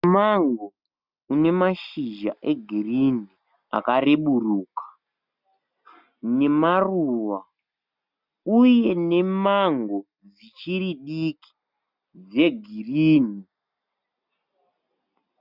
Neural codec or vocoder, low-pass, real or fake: none; 5.4 kHz; real